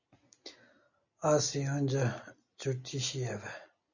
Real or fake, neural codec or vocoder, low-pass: real; none; 7.2 kHz